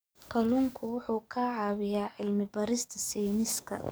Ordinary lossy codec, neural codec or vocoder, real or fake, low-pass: none; codec, 44.1 kHz, 7.8 kbps, DAC; fake; none